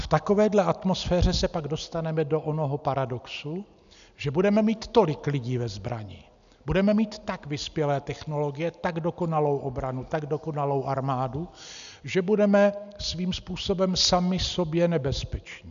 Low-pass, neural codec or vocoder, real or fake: 7.2 kHz; none; real